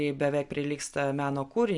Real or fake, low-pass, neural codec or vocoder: real; 10.8 kHz; none